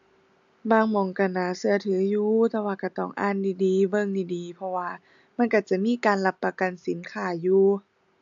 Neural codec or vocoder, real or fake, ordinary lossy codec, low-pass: none; real; AAC, 64 kbps; 7.2 kHz